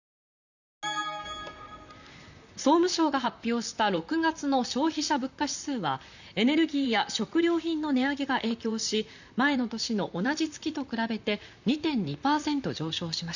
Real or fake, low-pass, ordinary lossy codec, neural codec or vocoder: fake; 7.2 kHz; none; vocoder, 44.1 kHz, 128 mel bands, Pupu-Vocoder